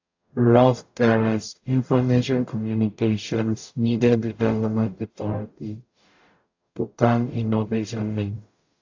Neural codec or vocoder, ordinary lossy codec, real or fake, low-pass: codec, 44.1 kHz, 0.9 kbps, DAC; AAC, 48 kbps; fake; 7.2 kHz